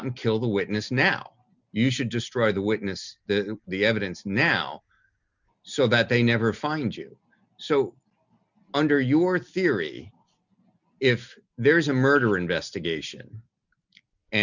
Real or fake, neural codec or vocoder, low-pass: real; none; 7.2 kHz